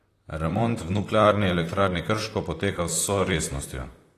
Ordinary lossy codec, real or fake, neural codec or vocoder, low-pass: AAC, 48 kbps; fake; vocoder, 44.1 kHz, 128 mel bands, Pupu-Vocoder; 14.4 kHz